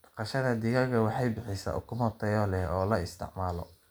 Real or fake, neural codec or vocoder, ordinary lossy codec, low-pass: real; none; none; none